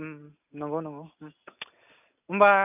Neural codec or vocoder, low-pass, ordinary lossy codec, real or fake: none; 3.6 kHz; none; real